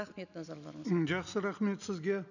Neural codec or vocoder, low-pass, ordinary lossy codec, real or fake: none; 7.2 kHz; none; real